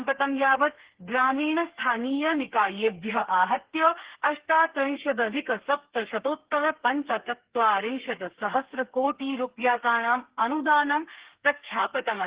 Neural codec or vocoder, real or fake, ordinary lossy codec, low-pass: codec, 32 kHz, 1.9 kbps, SNAC; fake; Opus, 16 kbps; 3.6 kHz